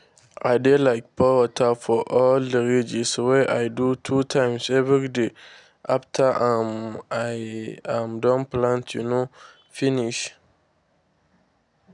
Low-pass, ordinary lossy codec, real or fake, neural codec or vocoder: 10.8 kHz; none; real; none